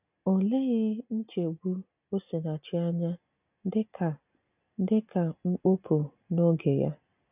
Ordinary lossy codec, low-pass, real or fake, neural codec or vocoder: none; 3.6 kHz; real; none